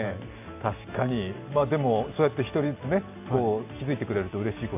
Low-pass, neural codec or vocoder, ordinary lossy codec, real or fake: 3.6 kHz; none; AAC, 32 kbps; real